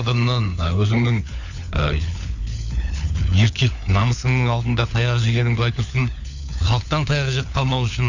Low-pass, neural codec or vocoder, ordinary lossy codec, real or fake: 7.2 kHz; codec, 16 kHz, 4 kbps, FunCodec, trained on LibriTTS, 50 frames a second; none; fake